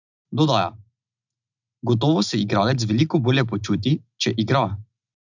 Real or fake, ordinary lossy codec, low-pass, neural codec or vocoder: real; none; 7.2 kHz; none